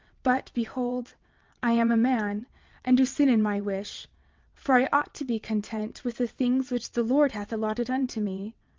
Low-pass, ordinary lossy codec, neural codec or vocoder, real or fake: 7.2 kHz; Opus, 24 kbps; vocoder, 22.05 kHz, 80 mel bands, WaveNeXt; fake